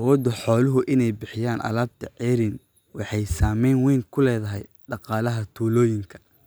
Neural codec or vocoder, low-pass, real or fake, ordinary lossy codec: none; none; real; none